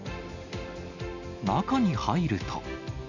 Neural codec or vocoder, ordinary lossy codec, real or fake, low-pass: none; none; real; 7.2 kHz